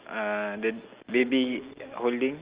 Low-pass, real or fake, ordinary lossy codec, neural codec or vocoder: 3.6 kHz; real; Opus, 32 kbps; none